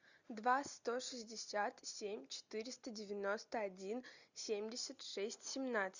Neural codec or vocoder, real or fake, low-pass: none; real; 7.2 kHz